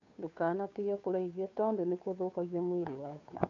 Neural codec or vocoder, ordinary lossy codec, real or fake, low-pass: codec, 16 kHz, 2 kbps, FunCodec, trained on Chinese and English, 25 frames a second; none; fake; 7.2 kHz